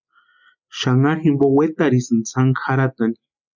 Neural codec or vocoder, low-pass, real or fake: none; 7.2 kHz; real